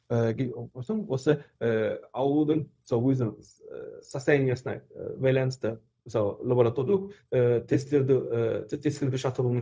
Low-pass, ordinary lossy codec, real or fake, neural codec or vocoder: none; none; fake; codec, 16 kHz, 0.4 kbps, LongCat-Audio-Codec